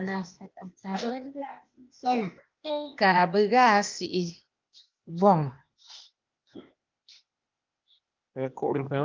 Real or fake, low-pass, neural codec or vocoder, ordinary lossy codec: fake; 7.2 kHz; codec, 16 kHz, 0.8 kbps, ZipCodec; Opus, 24 kbps